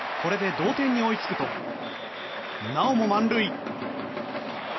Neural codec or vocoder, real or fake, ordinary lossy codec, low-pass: none; real; MP3, 24 kbps; 7.2 kHz